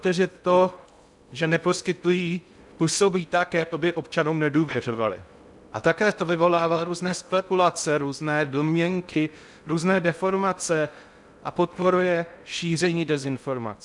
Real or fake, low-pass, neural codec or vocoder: fake; 10.8 kHz; codec, 16 kHz in and 24 kHz out, 0.6 kbps, FocalCodec, streaming, 2048 codes